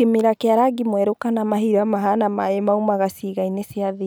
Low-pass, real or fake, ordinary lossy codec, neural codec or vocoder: none; real; none; none